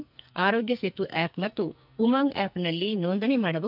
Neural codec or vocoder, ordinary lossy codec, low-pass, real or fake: codec, 44.1 kHz, 2.6 kbps, SNAC; none; 5.4 kHz; fake